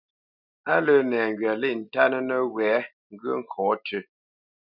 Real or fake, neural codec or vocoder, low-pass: real; none; 5.4 kHz